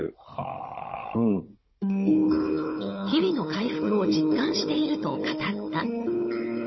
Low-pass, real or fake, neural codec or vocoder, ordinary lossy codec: 7.2 kHz; fake; codec, 16 kHz, 16 kbps, FunCodec, trained on Chinese and English, 50 frames a second; MP3, 24 kbps